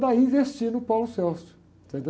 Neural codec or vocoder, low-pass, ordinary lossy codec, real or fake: none; none; none; real